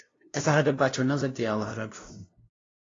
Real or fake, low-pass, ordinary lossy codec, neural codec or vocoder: fake; 7.2 kHz; AAC, 32 kbps; codec, 16 kHz, 0.5 kbps, FunCodec, trained on LibriTTS, 25 frames a second